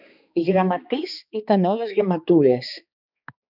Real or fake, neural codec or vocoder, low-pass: fake; codec, 16 kHz, 2 kbps, X-Codec, HuBERT features, trained on balanced general audio; 5.4 kHz